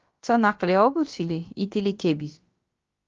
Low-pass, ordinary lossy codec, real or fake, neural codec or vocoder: 7.2 kHz; Opus, 32 kbps; fake; codec, 16 kHz, about 1 kbps, DyCAST, with the encoder's durations